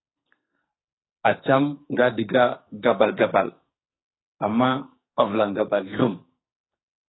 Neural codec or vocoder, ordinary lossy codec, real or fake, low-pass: codec, 44.1 kHz, 2.6 kbps, SNAC; AAC, 16 kbps; fake; 7.2 kHz